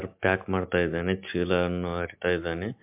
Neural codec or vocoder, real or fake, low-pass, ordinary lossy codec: none; real; 3.6 kHz; MP3, 32 kbps